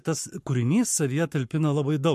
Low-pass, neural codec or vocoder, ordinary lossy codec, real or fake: 14.4 kHz; codec, 44.1 kHz, 7.8 kbps, Pupu-Codec; MP3, 64 kbps; fake